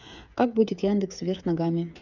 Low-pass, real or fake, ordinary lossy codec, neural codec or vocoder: 7.2 kHz; fake; none; codec, 16 kHz, 8 kbps, FreqCodec, smaller model